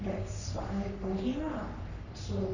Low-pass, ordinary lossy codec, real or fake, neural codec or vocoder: 7.2 kHz; none; fake; codec, 44.1 kHz, 3.4 kbps, Pupu-Codec